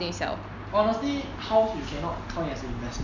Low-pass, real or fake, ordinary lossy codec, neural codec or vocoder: 7.2 kHz; real; none; none